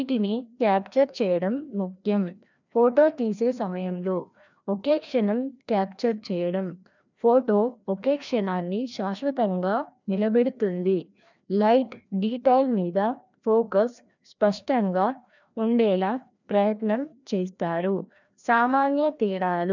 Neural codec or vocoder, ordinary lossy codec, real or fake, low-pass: codec, 16 kHz, 1 kbps, FreqCodec, larger model; none; fake; 7.2 kHz